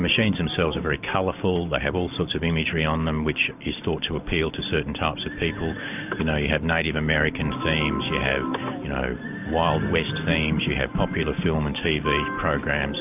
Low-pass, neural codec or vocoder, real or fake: 3.6 kHz; none; real